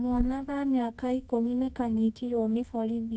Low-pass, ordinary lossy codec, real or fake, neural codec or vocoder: none; none; fake; codec, 24 kHz, 0.9 kbps, WavTokenizer, medium music audio release